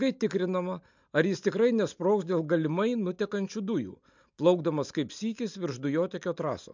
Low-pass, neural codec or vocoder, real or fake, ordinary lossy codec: 7.2 kHz; none; real; MP3, 64 kbps